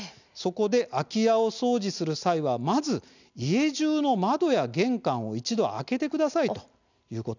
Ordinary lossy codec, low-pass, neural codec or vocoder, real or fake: none; 7.2 kHz; none; real